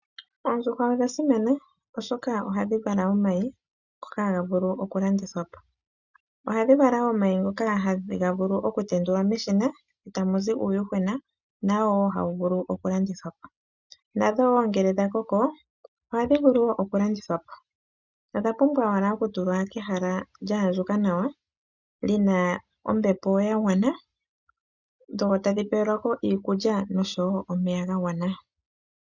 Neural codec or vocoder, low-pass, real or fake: none; 7.2 kHz; real